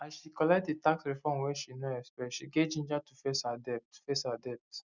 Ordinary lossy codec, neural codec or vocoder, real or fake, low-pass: none; none; real; 7.2 kHz